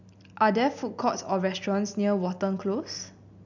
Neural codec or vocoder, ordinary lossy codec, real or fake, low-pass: none; none; real; 7.2 kHz